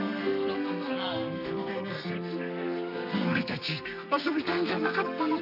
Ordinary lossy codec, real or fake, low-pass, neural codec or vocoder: none; fake; 5.4 kHz; codec, 32 kHz, 1.9 kbps, SNAC